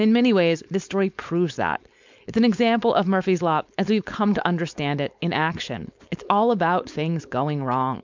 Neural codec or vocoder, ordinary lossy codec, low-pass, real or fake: codec, 16 kHz, 4.8 kbps, FACodec; MP3, 64 kbps; 7.2 kHz; fake